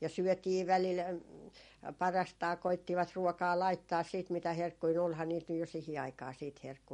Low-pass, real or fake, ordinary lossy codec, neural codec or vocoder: 19.8 kHz; real; MP3, 48 kbps; none